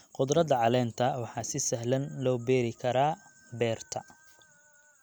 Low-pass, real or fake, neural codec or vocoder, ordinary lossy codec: none; real; none; none